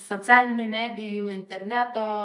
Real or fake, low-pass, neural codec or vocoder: fake; 10.8 kHz; codec, 24 kHz, 0.9 kbps, WavTokenizer, medium music audio release